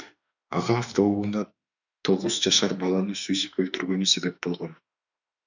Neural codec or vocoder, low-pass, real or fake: autoencoder, 48 kHz, 32 numbers a frame, DAC-VAE, trained on Japanese speech; 7.2 kHz; fake